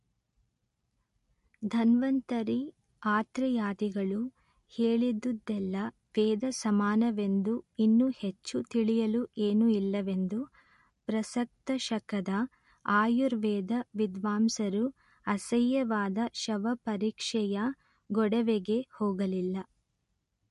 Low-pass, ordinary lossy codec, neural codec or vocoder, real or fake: 14.4 kHz; MP3, 48 kbps; none; real